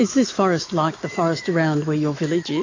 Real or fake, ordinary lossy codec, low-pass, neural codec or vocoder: fake; AAC, 32 kbps; 7.2 kHz; autoencoder, 48 kHz, 128 numbers a frame, DAC-VAE, trained on Japanese speech